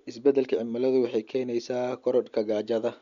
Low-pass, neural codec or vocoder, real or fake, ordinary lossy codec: 7.2 kHz; none; real; MP3, 48 kbps